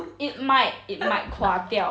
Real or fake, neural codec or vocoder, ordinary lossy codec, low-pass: real; none; none; none